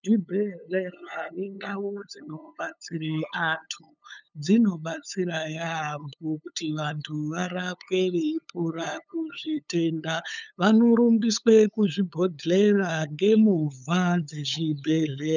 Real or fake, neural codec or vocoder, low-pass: fake; codec, 16 kHz, 8 kbps, FunCodec, trained on LibriTTS, 25 frames a second; 7.2 kHz